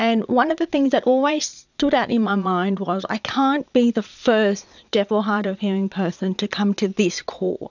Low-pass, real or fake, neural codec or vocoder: 7.2 kHz; fake; vocoder, 22.05 kHz, 80 mel bands, Vocos